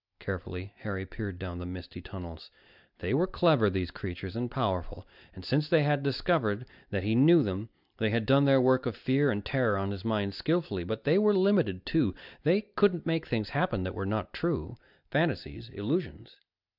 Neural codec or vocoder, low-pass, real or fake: none; 5.4 kHz; real